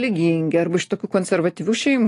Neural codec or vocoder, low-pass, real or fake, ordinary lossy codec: none; 10.8 kHz; real; AAC, 48 kbps